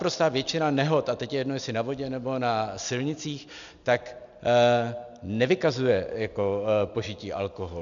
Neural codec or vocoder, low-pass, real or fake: none; 7.2 kHz; real